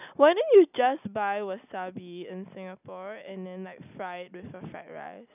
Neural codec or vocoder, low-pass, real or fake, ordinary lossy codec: none; 3.6 kHz; real; none